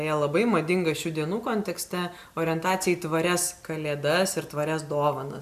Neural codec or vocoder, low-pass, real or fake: none; 14.4 kHz; real